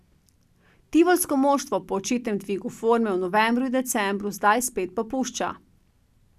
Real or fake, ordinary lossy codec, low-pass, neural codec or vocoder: real; none; 14.4 kHz; none